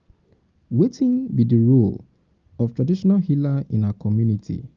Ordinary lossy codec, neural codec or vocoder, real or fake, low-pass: Opus, 24 kbps; none; real; 7.2 kHz